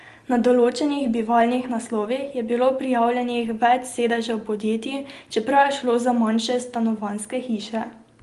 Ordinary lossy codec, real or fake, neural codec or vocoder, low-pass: Opus, 24 kbps; real; none; 10.8 kHz